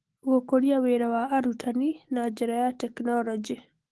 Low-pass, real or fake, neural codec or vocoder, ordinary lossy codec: 10.8 kHz; fake; autoencoder, 48 kHz, 128 numbers a frame, DAC-VAE, trained on Japanese speech; Opus, 16 kbps